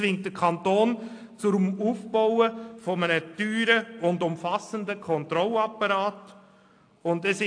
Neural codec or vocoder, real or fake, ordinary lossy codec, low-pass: none; real; AAC, 48 kbps; 9.9 kHz